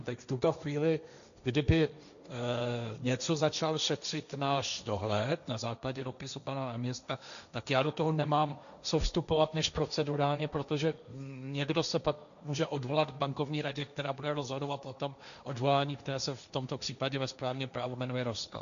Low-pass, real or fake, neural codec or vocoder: 7.2 kHz; fake; codec, 16 kHz, 1.1 kbps, Voila-Tokenizer